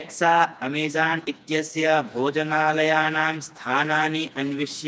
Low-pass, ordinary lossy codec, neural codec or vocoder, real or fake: none; none; codec, 16 kHz, 2 kbps, FreqCodec, smaller model; fake